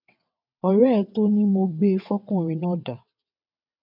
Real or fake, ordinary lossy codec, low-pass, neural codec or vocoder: fake; none; 5.4 kHz; vocoder, 22.05 kHz, 80 mel bands, WaveNeXt